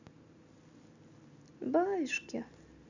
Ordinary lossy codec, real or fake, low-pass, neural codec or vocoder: Opus, 64 kbps; real; 7.2 kHz; none